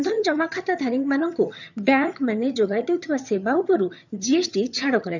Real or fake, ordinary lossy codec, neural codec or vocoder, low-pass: fake; none; vocoder, 22.05 kHz, 80 mel bands, HiFi-GAN; 7.2 kHz